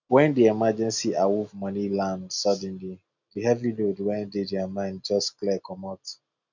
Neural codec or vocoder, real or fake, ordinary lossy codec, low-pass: none; real; none; 7.2 kHz